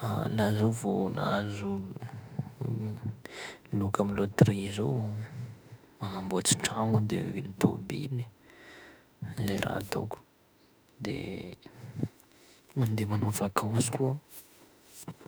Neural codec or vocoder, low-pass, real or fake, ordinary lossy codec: autoencoder, 48 kHz, 32 numbers a frame, DAC-VAE, trained on Japanese speech; none; fake; none